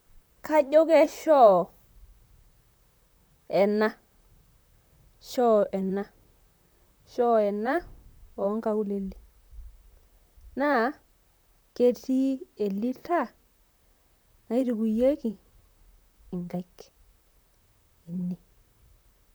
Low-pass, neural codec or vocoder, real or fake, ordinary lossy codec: none; vocoder, 44.1 kHz, 128 mel bands, Pupu-Vocoder; fake; none